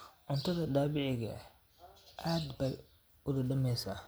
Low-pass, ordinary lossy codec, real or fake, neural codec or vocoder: none; none; real; none